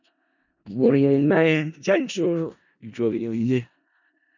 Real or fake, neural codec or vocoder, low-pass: fake; codec, 16 kHz in and 24 kHz out, 0.4 kbps, LongCat-Audio-Codec, four codebook decoder; 7.2 kHz